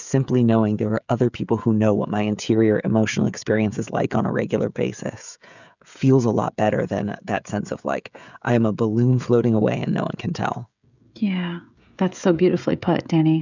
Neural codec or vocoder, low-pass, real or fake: codec, 16 kHz, 16 kbps, FreqCodec, smaller model; 7.2 kHz; fake